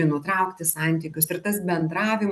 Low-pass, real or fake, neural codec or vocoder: 14.4 kHz; real; none